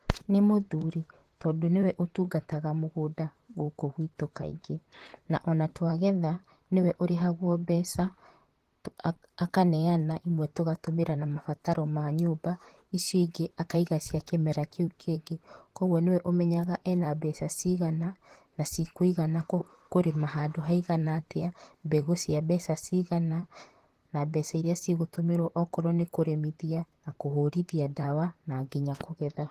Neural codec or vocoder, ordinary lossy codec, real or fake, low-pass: vocoder, 44.1 kHz, 128 mel bands, Pupu-Vocoder; Opus, 16 kbps; fake; 14.4 kHz